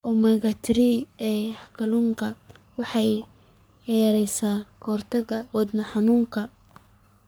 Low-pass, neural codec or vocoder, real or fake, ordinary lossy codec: none; codec, 44.1 kHz, 3.4 kbps, Pupu-Codec; fake; none